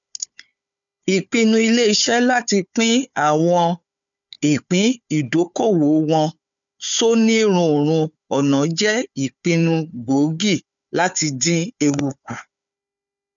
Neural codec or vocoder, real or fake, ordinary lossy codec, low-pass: codec, 16 kHz, 4 kbps, FunCodec, trained on Chinese and English, 50 frames a second; fake; none; 7.2 kHz